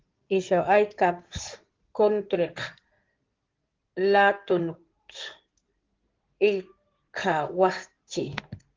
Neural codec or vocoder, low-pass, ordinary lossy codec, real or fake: codec, 16 kHz in and 24 kHz out, 2.2 kbps, FireRedTTS-2 codec; 7.2 kHz; Opus, 16 kbps; fake